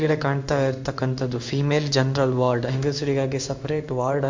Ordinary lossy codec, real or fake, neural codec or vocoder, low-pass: MP3, 64 kbps; fake; codec, 16 kHz in and 24 kHz out, 1 kbps, XY-Tokenizer; 7.2 kHz